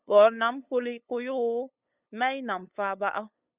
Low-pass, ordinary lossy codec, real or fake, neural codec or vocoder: 3.6 kHz; Opus, 64 kbps; fake; codec, 16 kHz, 8 kbps, FunCodec, trained on LibriTTS, 25 frames a second